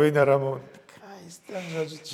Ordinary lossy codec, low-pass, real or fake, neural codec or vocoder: MP3, 96 kbps; 19.8 kHz; fake; vocoder, 44.1 kHz, 128 mel bands every 256 samples, BigVGAN v2